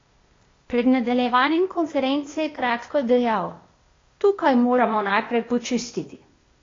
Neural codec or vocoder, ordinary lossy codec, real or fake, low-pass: codec, 16 kHz, 0.8 kbps, ZipCodec; AAC, 32 kbps; fake; 7.2 kHz